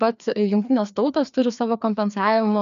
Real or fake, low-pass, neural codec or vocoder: fake; 7.2 kHz; codec, 16 kHz, 2 kbps, FreqCodec, larger model